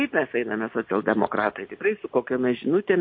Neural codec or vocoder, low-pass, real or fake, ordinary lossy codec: none; 7.2 kHz; real; MP3, 32 kbps